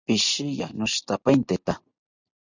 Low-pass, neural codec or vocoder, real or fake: 7.2 kHz; none; real